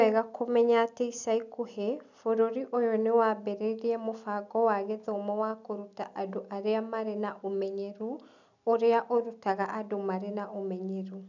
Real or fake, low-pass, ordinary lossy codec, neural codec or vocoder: real; 7.2 kHz; none; none